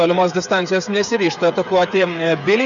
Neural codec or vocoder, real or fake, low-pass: codec, 16 kHz, 8 kbps, FreqCodec, smaller model; fake; 7.2 kHz